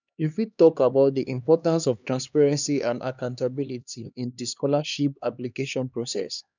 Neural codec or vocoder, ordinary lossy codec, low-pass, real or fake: codec, 16 kHz, 2 kbps, X-Codec, HuBERT features, trained on LibriSpeech; none; 7.2 kHz; fake